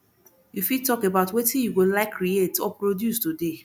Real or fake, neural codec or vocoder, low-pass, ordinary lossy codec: real; none; none; none